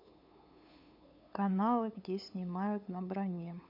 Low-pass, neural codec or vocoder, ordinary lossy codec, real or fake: 5.4 kHz; codec, 16 kHz, 8 kbps, FunCodec, trained on LibriTTS, 25 frames a second; MP3, 48 kbps; fake